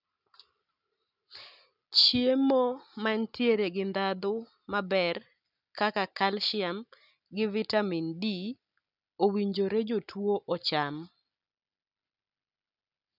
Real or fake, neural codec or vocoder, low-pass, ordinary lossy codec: real; none; 5.4 kHz; none